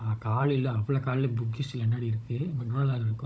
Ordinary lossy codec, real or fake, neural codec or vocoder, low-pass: none; fake; codec, 16 kHz, 16 kbps, FunCodec, trained on Chinese and English, 50 frames a second; none